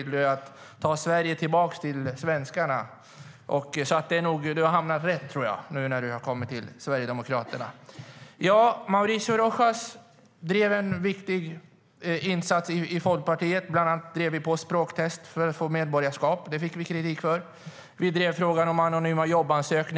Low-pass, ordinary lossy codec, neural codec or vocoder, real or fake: none; none; none; real